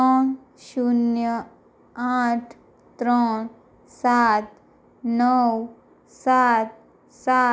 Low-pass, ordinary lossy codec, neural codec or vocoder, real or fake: none; none; none; real